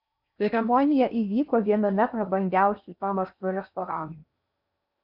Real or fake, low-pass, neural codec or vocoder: fake; 5.4 kHz; codec, 16 kHz in and 24 kHz out, 0.6 kbps, FocalCodec, streaming, 4096 codes